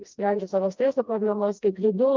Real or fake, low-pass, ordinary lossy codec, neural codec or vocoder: fake; 7.2 kHz; Opus, 24 kbps; codec, 16 kHz, 1 kbps, FreqCodec, smaller model